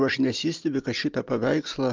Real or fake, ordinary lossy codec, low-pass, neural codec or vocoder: real; Opus, 24 kbps; 7.2 kHz; none